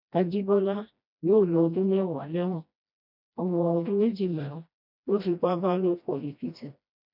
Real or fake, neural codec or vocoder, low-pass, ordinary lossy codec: fake; codec, 16 kHz, 1 kbps, FreqCodec, smaller model; 5.4 kHz; none